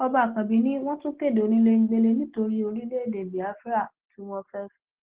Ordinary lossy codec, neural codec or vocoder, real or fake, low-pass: Opus, 16 kbps; none; real; 3.6 kHz